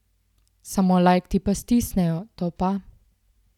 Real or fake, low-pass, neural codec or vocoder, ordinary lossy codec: real; 19.8 kHz; none; none